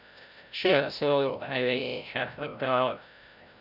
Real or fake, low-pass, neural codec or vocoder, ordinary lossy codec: fake; 5.4 kHz; codec, 16 kHz, 0.5 kbps, FreqCodec, larger model; none